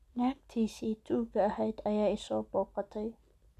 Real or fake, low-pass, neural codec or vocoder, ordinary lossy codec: real; 14.4 kHz; none; none